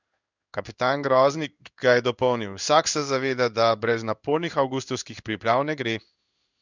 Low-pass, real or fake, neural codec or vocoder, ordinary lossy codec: 7.2 kHz; fake; codec, 16 kHz in and 24 kHz out, 1 kbps, XY-Tokenizer; none